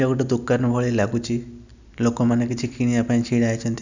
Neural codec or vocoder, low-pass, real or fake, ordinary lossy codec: none; 7.2 kHz; real; none